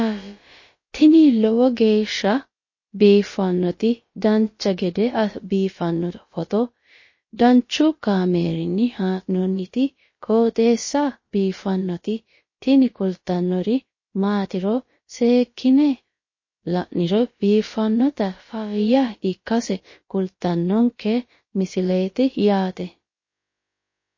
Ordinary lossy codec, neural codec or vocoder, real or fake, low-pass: MP3, 32 kbps; codec, 16 kHz, about 1 kbps, DyCAST, with the encoder's durations; fake; 7.2 kHz